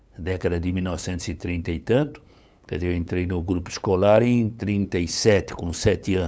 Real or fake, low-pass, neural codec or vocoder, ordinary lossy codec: fake; none; codec, 16 kHz, 8 kbps, FunCodec, trained on LibriTTS, 25 frames a second; none